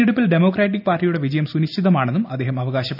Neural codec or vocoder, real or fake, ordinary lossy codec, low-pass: none; real; none; 5.4 kHz